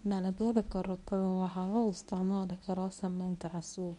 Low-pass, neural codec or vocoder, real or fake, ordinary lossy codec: 10.8 kHz; codec, 24 kHz, 0.9 kbps, WavTokenizer, medium speech release version 1; fake; none